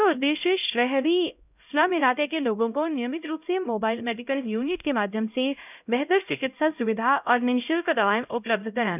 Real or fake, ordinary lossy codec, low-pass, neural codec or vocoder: fake; none; 3.6 kHz; codec, 16 kHz, 0.5 kbps, X-Codec, HuBERT features, trained on LibriSpeech